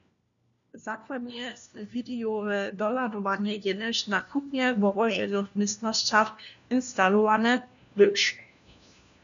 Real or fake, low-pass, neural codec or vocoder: fake; 7.2 kHz; codec, 16 kHz, 1 kbps, FunCodec, trained on LibriTTS, 50 frames a second